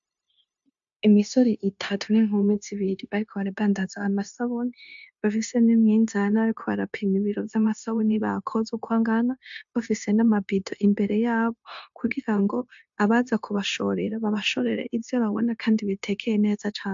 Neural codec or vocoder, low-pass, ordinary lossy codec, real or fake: codec, 16 kHz, 0.9 kbps, LongCat-Audio-Codec; 7.2 kHz; AAC, 64 kbps; fake